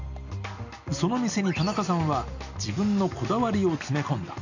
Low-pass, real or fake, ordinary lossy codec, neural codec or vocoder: 7.2 kHz; real; none; none